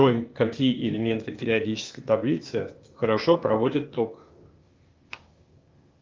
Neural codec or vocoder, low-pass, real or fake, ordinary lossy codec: codec, 16 kHz, 0.8 kbps, ZipCodec; 7.2 kHz; fake; Opus, 32 kbps